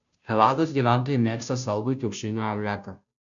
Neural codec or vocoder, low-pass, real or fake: codec, 16 kHz, 0.5 kbps, FunCodec, trained on Chinese and English, 25 frames a second; 7.2 kHz; fake